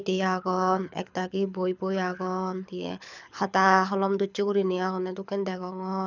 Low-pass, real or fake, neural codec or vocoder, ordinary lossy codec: 7.2 kHz; fake; codec, 24 kHz, 6 kbps, HILCodec; none